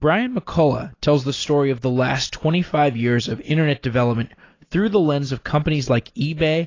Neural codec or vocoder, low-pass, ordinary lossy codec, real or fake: none; 7.2 kHz; AAC, 32 kbps; real